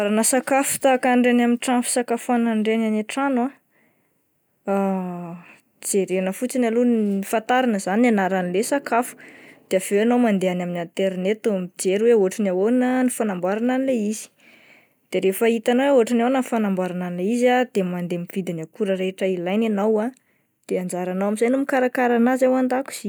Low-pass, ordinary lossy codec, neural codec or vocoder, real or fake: none; none; none; real